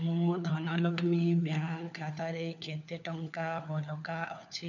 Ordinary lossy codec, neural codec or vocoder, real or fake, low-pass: none; codec, 16 kHz, 4 kbps, FunCodec, trained on LibriTTS, 50 frames a second; fake; 7.2 kHz